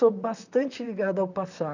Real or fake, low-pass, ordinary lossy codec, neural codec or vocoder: fake; 7.2 kHz; none; vocoder, 44.1 kHz, 128 mel bands, Pupu-Vocoder